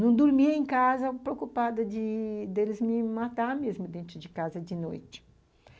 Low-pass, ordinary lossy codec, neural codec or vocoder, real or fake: none; none; none; real